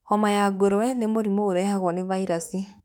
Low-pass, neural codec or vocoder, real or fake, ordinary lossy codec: 19.8 kHz; autoencoder, 48 kHz, 32 numbers a frame, DAC-VAE, trained on Japanese speech; fake; none